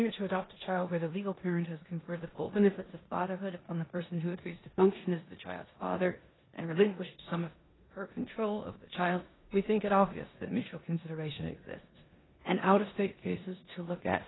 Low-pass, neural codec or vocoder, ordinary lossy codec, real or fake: 7.2 kHz; codec, 16 kHz in and 24 kHz out, 0.9 kbps, LongCat-Audio-Codec, four codebook decoder; AAC, 16 kbps; fake